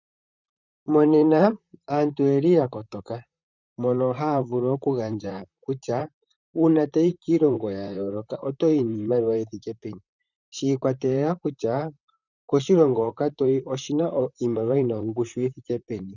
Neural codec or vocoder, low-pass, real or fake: vocoder, 44.1 kHz, 128 mel bands, Pupu-Vocoder; 7.2 kHz; fake